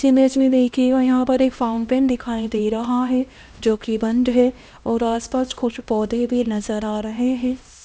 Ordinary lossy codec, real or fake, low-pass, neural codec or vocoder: none; fake; none; codec, 16 kHz, 1 kbps, X-Codec, HuBERT features, trained on LibriSpeech